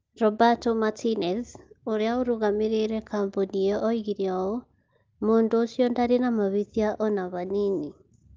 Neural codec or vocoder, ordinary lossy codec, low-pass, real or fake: none; Opus, 24 kbps; 7.2 kHz; real